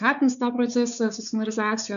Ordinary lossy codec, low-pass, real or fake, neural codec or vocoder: MP3, 96 kbps; 7.2 kHz; fake; codec, 16 kHz, 4 kbps, X-Codec, WavLM features, trained on Multilingual LibriSpeech